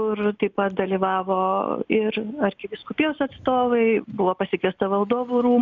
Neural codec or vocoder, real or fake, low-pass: none; real; 7.2 kHz